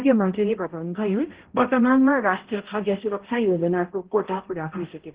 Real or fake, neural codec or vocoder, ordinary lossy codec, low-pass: fake; codec, 16 kHz, 0.5 kbps, X-Codec, HuBERT features, trained on balanced general audio; Opus, 16 kbps; 3.6 kHz